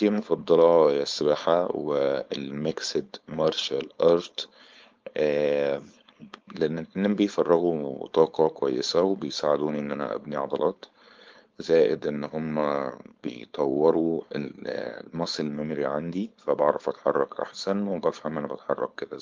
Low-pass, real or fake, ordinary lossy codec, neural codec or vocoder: 7.2 kHz; fake; Opus, 32 kbps; codec, 16 kHz, 4.8 kbps, FACodec